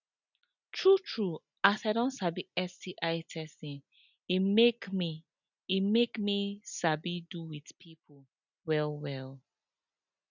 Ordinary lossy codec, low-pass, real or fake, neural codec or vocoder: none; 7.2 kHz; real; none